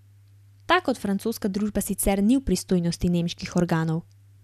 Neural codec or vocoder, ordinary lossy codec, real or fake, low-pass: none; none; real; 14.4 kHz